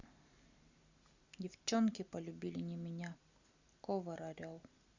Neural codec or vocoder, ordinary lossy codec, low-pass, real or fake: none; Opus, 64 kbps; 7.2 kHz; real